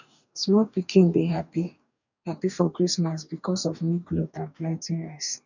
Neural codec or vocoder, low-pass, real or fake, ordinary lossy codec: codec, 44.1 kHz, 2.6 kbps, DAC; 7.2 kHz; fake; none